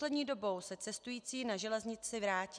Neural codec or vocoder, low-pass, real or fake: none; 9.9 kHz; real